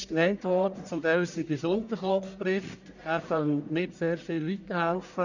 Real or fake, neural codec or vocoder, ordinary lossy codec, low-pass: fake; codec, 44.1 kHz, 1.7 kbps, Pupu-Codec; none; 7.2 kHz